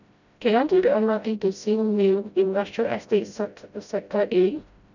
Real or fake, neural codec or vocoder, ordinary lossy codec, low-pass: fake; codec, 16 kHz, 0.5 kbps, FreqCodec, smaller model; none; 7.2 kHz